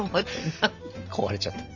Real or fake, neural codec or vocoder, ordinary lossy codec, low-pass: real; none; none; 7.2 kHz